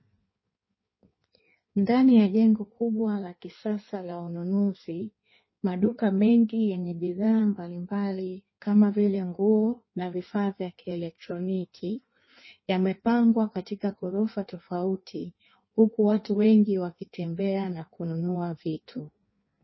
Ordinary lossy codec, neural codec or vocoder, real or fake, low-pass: MP3, 24 kbps; codec, 16 kHz in and 24 kHz out, 1.1 kbps, FireRedTTS-2 codec; fake; 7.2 kHz